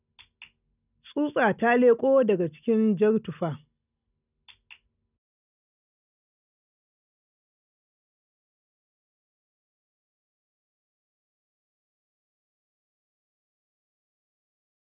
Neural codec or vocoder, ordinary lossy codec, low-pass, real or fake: none; none; 3.6 kHz; real